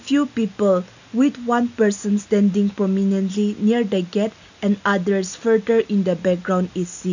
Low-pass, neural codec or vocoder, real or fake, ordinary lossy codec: 7.2 kHz; none; real; none